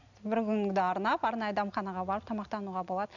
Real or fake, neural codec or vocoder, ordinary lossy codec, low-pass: real; none; none; 7.2 kHz